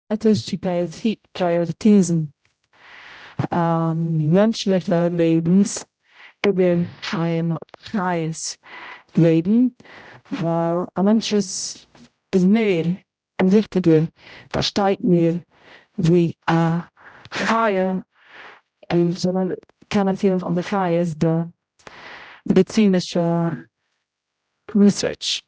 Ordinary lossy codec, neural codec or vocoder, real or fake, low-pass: none; codec, 16 kHz, 0.5 kbps, X-Codec, HuBERT features, trained on general audio; fake; none